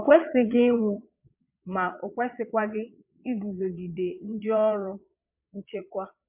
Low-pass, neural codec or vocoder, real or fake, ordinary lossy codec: 3.6 kHz; vocoder, 22.05 kHz, 80 mel bands, Vocos; fake; MP3, 32 kbps